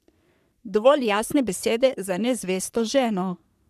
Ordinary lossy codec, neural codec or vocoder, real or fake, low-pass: none; codec, 44.1 kHz, 3.4 kbps, Pupu-Codec; fake; 14.4 kHz